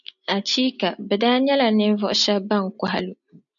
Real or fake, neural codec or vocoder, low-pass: real; none; 7.2 kHz